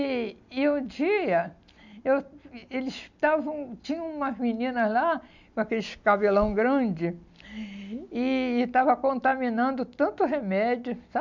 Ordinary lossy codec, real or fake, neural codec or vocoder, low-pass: none; real; none; 7.2 kHz